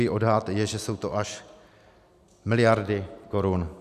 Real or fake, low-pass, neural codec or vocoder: real; 14.4 kHz; none